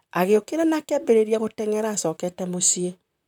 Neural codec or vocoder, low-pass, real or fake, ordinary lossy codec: vocoder, 44.1 kHz, 128 mel bands, Pupu-Vocoder; 19.8 kHz; fake; none